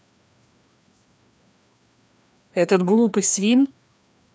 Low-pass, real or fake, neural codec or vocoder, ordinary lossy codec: none; fake; codec, 16 kHz, 2 kbps, FreqCodec, larger model; none